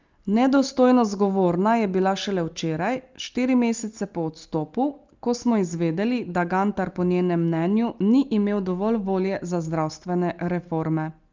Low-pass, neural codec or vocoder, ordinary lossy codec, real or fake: 7.2 kHz; none; Opus, 32 kbps; real